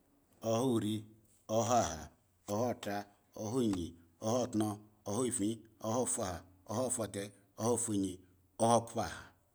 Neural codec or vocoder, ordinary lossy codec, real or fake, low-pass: none; none; real; none